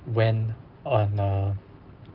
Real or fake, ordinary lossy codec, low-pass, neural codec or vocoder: real; Opus, 32 kbps; 5.4 kHz; none